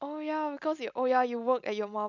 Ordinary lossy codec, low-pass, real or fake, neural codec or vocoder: none; 7.2 kHz; real; none